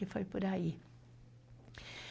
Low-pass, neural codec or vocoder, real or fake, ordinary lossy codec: none; none; real; none